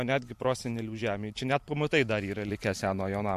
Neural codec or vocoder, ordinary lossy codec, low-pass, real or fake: vocoder, 44.1 kHz, 128 mel bands every 512 samples, BigVGAN v2; MP3, 64 kbps; 14.4 kHz; fake